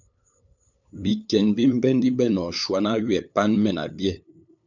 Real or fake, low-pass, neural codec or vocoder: fake; 7.2 kHz; codec, 16 kHz, 8 kbps, FunCodec, trained on LibriTTS, 25 frames a second